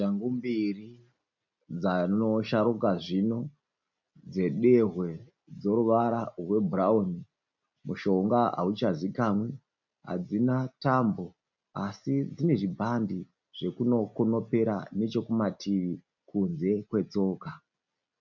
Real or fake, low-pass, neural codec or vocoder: real; 7.2 kHz; none